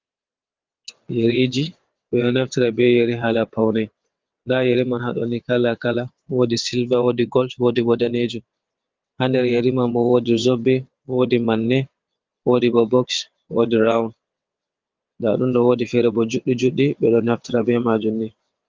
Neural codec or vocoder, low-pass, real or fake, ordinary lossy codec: vocoder, 22.05 kHz, 80 mel bands, WaveNeXt; 7.2 kHz; fake; Opus, 32 kbps